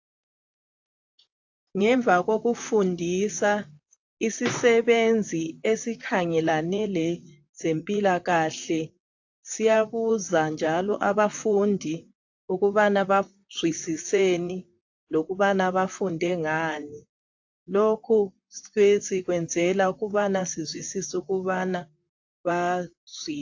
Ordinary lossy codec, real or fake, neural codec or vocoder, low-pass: AAC, 48 kbps; fake; vocoder, 44.1 kHz, 128 mel bands, Pupu-Vocoder; 7.2 kHz